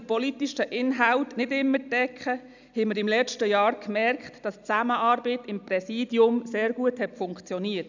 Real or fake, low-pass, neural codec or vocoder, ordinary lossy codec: real; 7.2 kHz; none; none